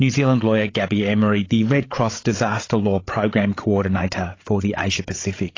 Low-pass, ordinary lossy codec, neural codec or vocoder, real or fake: 7.2 kHz; AAC, 32 kbps; codec, 16 kHz, 8 kbps, FreqCodec, larger model; fake